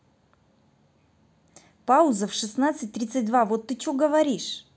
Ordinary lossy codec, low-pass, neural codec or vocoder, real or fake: none; none; none; real